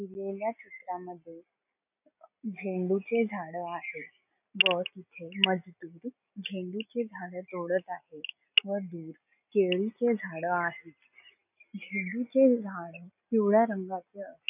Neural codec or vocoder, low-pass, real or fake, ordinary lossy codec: none; 3.6 kHz; real; none